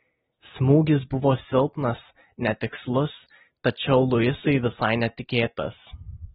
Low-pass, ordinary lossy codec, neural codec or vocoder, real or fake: 19.8 kHz; AAC, 16 kbps; none; real